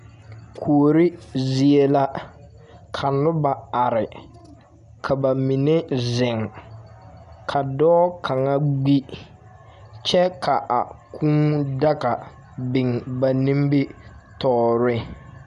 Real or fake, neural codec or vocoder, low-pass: real; none; 10.8 kHz